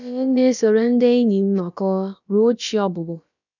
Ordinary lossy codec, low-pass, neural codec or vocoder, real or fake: none; 7.2 kHz; codec, 16 kHz, about 1 kbps, DyCAST, with the encoder's durations; fake